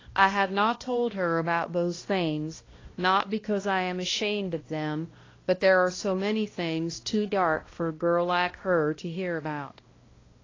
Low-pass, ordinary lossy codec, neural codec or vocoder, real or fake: 7.2 kHz; AAC, 32 kbps; codec, 16 kHz, 1 kbps, X-Codec, HuBERT features, trained on balanced general audio; fake